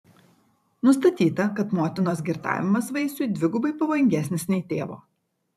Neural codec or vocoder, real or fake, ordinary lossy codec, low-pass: vocoder, 44.1 kHz, 128 mel bands every 512 samples, BigVGAN v2; fake; AAC, 96 kbps; 14.4 kHz